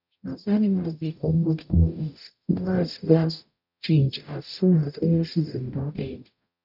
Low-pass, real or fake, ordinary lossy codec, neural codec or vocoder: 5.4 kHz; fake; none; codec, 44.1 kHz, 0.9 kbps, DAC